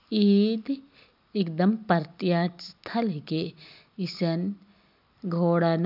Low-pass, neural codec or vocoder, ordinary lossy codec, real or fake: 5.4 kHz; none; none; real